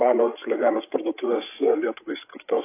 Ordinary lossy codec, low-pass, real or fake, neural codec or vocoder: AAC, 24 kbps; 3.6 kHz; fake; codec, 16 kHz, 8 kbps, FreqCodec, larger model